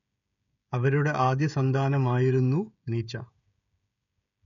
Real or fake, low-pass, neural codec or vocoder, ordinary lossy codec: fake; 7.2 kHz; codec, 16 kHz, 16 kbps, FreqCodec, smaller model; none